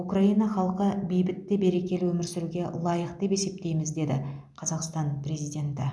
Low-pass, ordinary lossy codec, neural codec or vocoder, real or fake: none; none; none; real